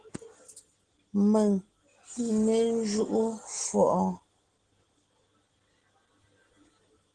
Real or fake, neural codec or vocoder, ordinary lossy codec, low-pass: real; none; Opus, 16 kbps; 9.9 kHz